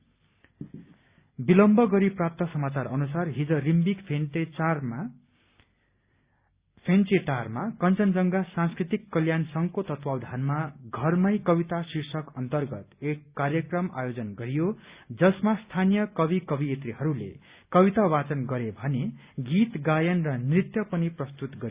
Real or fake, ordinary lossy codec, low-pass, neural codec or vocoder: real; Opus, 64 kbps; 3.6 kHz; none